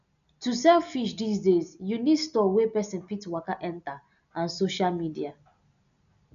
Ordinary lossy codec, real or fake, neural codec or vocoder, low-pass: none; real; none; 7.2 kHz